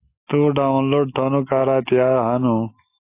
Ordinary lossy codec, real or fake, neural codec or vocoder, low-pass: AAC, 24 kbps; real; none; 3.6 kHz